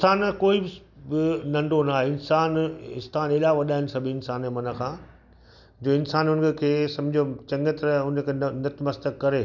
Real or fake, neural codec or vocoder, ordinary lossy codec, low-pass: real; none; none; 7.2 kHz